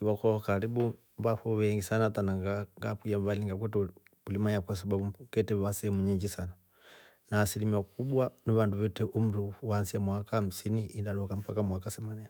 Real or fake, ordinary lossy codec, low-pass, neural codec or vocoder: fake; none; none; autoencoder, 48 kHz, 128 numbers a frame, DAC-VAE, trained on Japanese speech